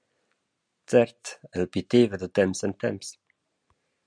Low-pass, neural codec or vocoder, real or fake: 9.9 kHz; none; real